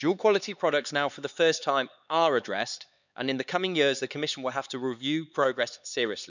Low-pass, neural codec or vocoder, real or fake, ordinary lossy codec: 7.2 kHz; codec, 16 kHz, 4 kbps, X-Codec, HuBERT features, trained on LibriSpeech; fake; none